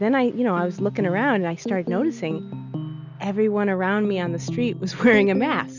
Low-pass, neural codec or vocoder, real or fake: 7.2 kHz; none; real